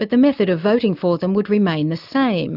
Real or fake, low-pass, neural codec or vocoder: real; 5.4 kHz; none